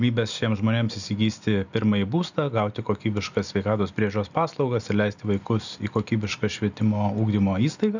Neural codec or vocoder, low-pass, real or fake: none; 7.2 kHz; real